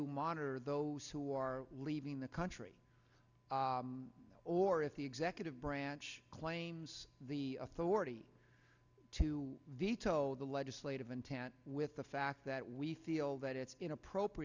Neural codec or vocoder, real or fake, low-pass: none; real; 7.2 kHz